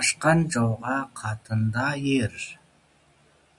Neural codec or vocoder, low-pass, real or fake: none; 10.8 kHz; real